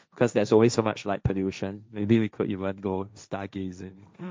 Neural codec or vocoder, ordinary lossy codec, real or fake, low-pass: codec, 16 kHz, 1.1 kbps, Voila-Tokenizer; none; fake; none